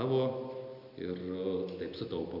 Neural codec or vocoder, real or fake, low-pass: none; real; 5.4 kHz